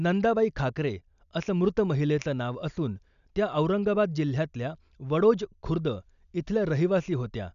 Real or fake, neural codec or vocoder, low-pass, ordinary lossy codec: real; none; 7.2 kHz; none